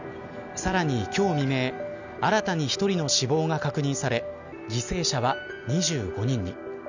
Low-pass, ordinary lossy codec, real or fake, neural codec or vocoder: 7.2 kHz; none; real; none